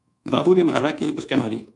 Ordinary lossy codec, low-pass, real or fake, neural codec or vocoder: AAC, 64 kbps; 10.8 kHz; fake; codec, 24 kHz, 1.2 kbps, DualCodec